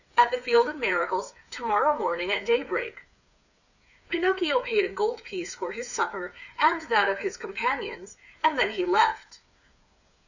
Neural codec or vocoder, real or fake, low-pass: codec, 16 kHz, 8 kbps, FreqCodec, smaller model; fake; 7.2 kHz